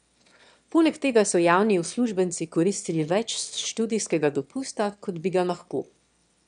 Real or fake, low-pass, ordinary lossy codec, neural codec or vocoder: fake; 9.9 kHz; none; autoencoder, 22.05 kHz, a latent of 192 numbers a frame, VITS, trained on one speaker